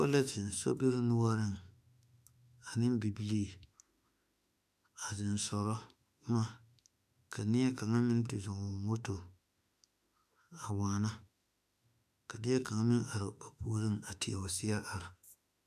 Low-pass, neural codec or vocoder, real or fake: 14.4 kHz; autoencoder, 48 kHz, 32 numbers a frame, DAC-VAE, trained on Japanese speech; fake